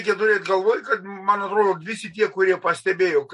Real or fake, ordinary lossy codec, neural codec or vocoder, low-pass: real; MP3, 48 kbps; none; 14.4 kHz